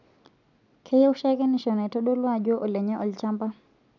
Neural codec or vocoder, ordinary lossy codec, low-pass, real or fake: none; none; 7.2 kHz; real